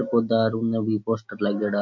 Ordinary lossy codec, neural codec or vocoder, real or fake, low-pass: MP3, 64 kbps; none; real; 7.2 kHz